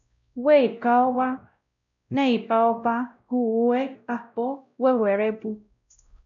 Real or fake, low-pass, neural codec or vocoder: fake; 7.2 kHz; codec, 16 kHz, 0.5 kbps, X-Codec, WavLM features, trained on Multilingual LibriSpeech